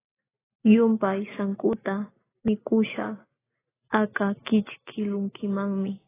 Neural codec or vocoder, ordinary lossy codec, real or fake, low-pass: none; AAC, 16 kbps; real; 3.6 kHz